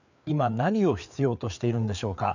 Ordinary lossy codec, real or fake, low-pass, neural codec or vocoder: none; fake; 7.2 kHz; codec, 16 kHz, 4 kbps, FreqCodec, larger model